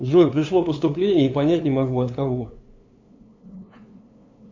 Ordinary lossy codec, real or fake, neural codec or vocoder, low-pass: AAC, 48 kbps; fake; codec, 16 kHz, 2 kbps, FunCodec, trained on LibriTTS, 25 frames a second; 7.2 kHz